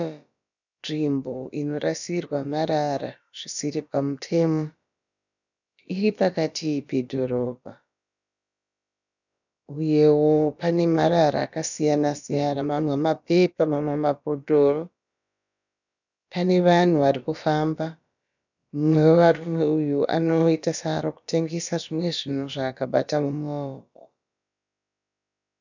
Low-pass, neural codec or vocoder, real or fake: 7.2 kHz; codec, 16 kHz, about 1 kbps, DyCAST, with the encoder's durations; fake